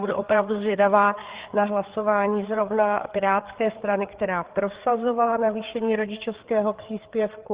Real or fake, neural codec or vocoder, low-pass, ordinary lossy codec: fake; codec, 16 kHz, 4 kbps, FreqCodec, larger model; 3.6 kHz; Opus, 16 kbps